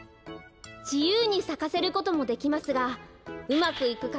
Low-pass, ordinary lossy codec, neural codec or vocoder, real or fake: none; none; none; real